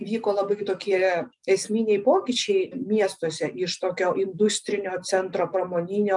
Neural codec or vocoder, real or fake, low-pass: none; real; 10.8 kHz